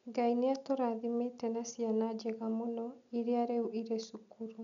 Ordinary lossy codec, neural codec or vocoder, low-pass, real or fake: none; none; 7.2 kHz; real